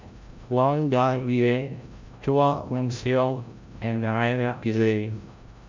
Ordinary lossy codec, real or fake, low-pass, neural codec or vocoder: MP3, 64 kbps; fake; 7.2 kHz; codec, 16 kHz, 0.5 kbps, FreqCodec, larger model